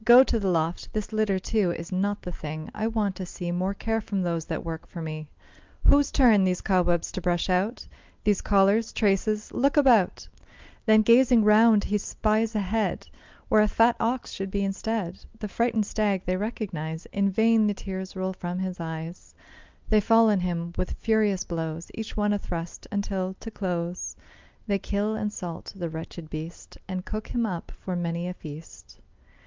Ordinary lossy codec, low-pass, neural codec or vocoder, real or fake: Opus, 24 kbps; 7.2 kHz; none; real